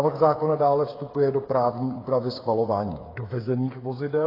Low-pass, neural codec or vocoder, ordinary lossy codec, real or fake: 5.4 kHz; codec, 16 kHz, 8 kbps, FreqCodec, smaller model; AAC, 24 kbps; fake